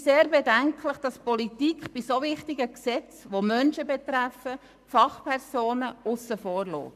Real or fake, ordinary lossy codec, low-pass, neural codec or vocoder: fake; none; 14.4 kHz; codec, 44.1 kHz, 7.8 kbps, Pupu-Codec